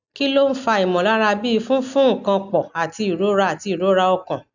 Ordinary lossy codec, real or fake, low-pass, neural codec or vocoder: none; real; 7.2 kHz; none